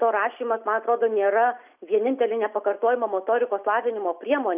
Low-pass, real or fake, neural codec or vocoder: 3.6 kHz; real; none